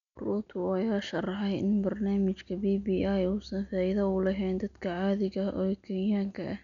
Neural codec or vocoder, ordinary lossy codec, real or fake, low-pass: none; none; real; 7.2 kHz